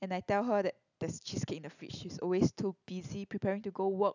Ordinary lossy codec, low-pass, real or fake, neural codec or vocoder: none; 7.2 kHz; real; none